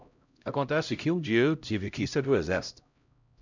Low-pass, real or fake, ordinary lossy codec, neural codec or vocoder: 7.2 kHz; fake; none; codec, 16 kHz, 0.5 kbps, X-Codec, HuBERT features, trained on LibriSpeech